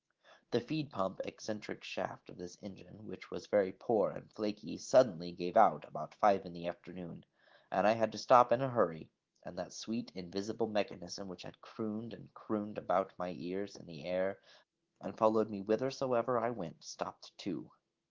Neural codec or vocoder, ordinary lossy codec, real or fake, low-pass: autoencoder, 48 kHz, 128 numbers a frame, DAC-VAE, trained on Japanese speech; Opus, 16 kbps; fake; 7.2 kHz